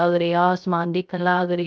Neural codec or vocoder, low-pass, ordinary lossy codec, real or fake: codec, 16 kHz, 0.3 kbps, FocalCodec; none; none; fake